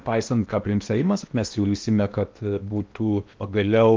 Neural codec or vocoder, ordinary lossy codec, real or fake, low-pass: codec, 16 kHz in and 24 kHz out, 0.8 kbps, FocalCodec, streaming, 65536 codes; Opus, 32 kbps; fake; 7.2 kHz